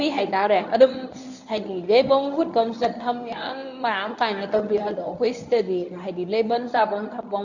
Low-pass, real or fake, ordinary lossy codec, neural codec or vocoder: 7.2 kHz; fake; none; codec, 24 kHz, 0.9 kbps, WavTokenizer, medium speech release version 1